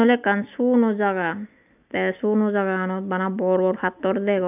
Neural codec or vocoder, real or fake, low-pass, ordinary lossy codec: none; real; 3.6 kHz; none